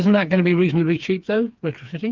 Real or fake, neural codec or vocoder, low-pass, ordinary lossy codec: fake; codec, 16 kHz, 4 kbps, FreqCodec, smaller model; 7.2 kHz; Opus, 24 kbps